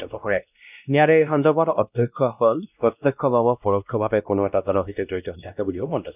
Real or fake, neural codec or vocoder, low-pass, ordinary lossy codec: fake; codec, 16 kHz, 1 kbps, X-Codec, WavLM features, trained on Multilingual LibriSpeech; 3.6 kHz; none